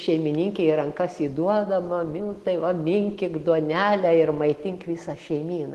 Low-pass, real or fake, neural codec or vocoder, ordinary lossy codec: 14.4 kHz; real; none; Opus, 16 kbps